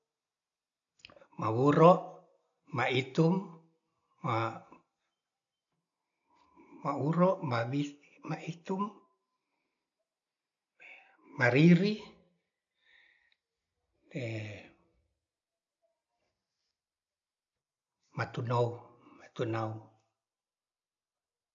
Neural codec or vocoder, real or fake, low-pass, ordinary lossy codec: none; real; 7.2 kHz; none